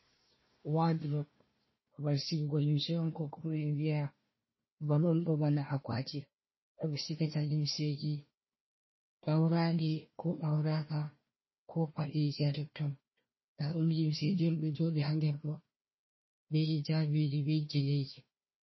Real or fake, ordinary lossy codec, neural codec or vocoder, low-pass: fake; MP3, 24 kbps; codec, 16 kHz, 1 kbps, FunCodec, trained on Chinese and English, 50 frames a second; 7.2 kHz